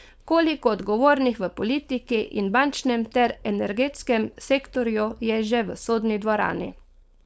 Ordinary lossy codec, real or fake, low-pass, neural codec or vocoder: none; fake; none; codec, 16 kHz, 4.8 kbps, FACodec